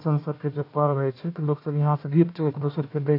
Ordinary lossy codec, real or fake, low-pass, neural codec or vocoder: AAC, 32 kbps; fake; 5.4 kHz; codec, 16 kHz, 1 kbps, FunCodec, trained on Chinese and English, 50 frames a second